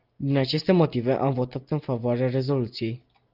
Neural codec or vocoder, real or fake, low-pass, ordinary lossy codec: none; real; 5.4 kHz; Opus, 24 kbps